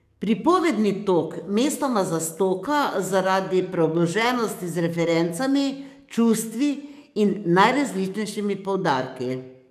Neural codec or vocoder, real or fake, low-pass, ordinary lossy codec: codec, 44.1 kHz, 7.8 kbps, DAC; fake; 14.4 kHz; none